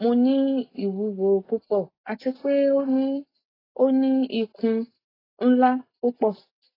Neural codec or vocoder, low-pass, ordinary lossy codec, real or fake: none; 5.4 kHz; AAC, 24 kbps; real